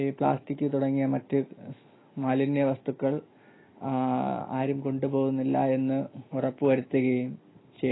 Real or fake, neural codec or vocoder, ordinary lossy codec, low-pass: real; none; AAC, 16 kbps; 7.2 kHz